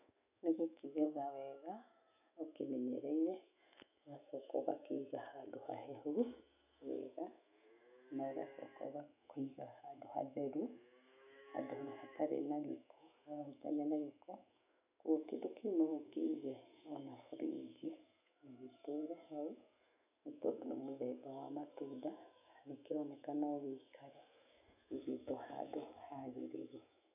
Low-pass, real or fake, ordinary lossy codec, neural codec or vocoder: 3.6 kHz; fake; none; autoencoder, 48 kHz, 128 numbers a frame, DAC-VAE, trained on Japanese speech